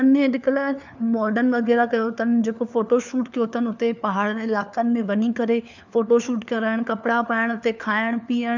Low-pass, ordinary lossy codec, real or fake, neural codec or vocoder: 7.2 kHz; none; fake; codec, 16 kHz, 4 kbps, FunCodec, trained on LibriTTS, 50 frames a second